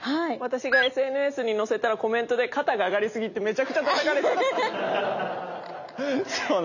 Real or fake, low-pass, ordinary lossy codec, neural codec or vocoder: real; 7.2 kHz; none; none